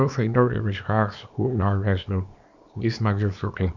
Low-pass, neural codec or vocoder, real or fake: 7.2 kHz; codec, 24 kHz, 0.9 kbps, WavTokenizer, small release; fake